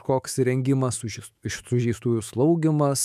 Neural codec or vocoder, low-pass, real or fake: autoencoder, 48 kHz, 128 numbers a frame, DAC-VAE, trained on Japanese speech; 14.4 kHz; fake